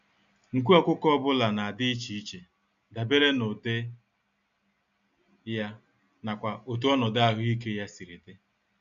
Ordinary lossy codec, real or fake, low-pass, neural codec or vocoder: none; real; 7.2 kHz; none